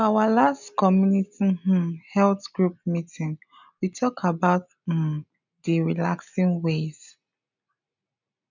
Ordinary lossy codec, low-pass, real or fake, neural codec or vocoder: none; 7.2 kHz; real; none